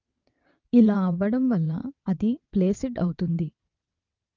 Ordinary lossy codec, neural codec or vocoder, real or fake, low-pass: Opus, 24 kbps; vocoder, 22.05 kHz, 80 mel bands, WaveNeXt; fake; 7.2 kHz